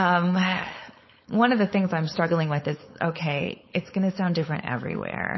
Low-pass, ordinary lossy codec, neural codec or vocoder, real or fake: 7.2 kHz; MP3, 24 kbps; codec, 16 kHz, 4.8 kbps, FACodec; fake